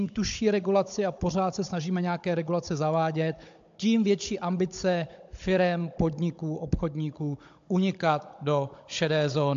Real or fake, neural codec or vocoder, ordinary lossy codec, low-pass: fake; codec, 16 kHz, 16 kbps, FunCodec, trained on Chinese and English, 50 frames a second; AAC, 64 kbps; 7.2 kHz